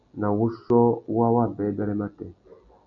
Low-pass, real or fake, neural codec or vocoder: 7.2 kHz; real; none